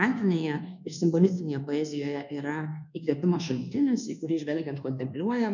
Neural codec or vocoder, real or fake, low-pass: codec, 24 kHz, 1.2 kbps, DualCodec; fake; 7.2 kHz